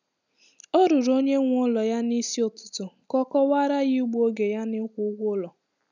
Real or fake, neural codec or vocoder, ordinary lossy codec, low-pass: real; none; none; 7.2 kHz